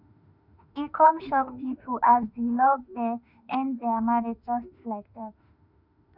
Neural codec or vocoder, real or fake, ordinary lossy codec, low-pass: autoencoder, 48 kHz, 32 numbers a frame, DAC-VAE, trained on Japanese speech; fake; none; 5.4 kHz